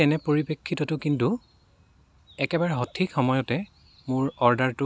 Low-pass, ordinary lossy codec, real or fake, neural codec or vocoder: none; none; real; none